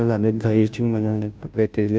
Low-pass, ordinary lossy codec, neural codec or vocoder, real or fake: none; none; codec, 16 kHz, 0.5 kbps, FunCodec, trained on Chinese and English, 25 frames a second; fake